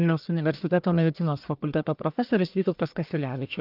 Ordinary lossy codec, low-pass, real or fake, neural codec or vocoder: Opus, 24 kbps; 5.4 kHz; fake; codec, 44.1 kHz, 1.7 kbps, Pupu-Codec